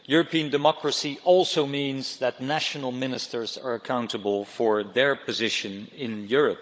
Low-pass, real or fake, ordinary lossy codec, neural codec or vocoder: none; fake; none; codec, 16 kHz, 16 kbps, FunCodec, trained on Chinese and English, 50 frames a second